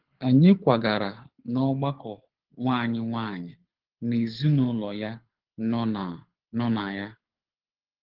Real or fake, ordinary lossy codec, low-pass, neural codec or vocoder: fake; Opus, 16 kbps; 5.4 kHz; codec, 24 kHz, 6 kbps, HILCodec